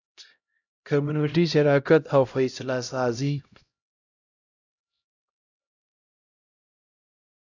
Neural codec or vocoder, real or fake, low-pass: codec, 16 kHz, 0.5 kbps, X-Codec, HuBERT features, trained on LibriSpeech; fake; 7.2 kHz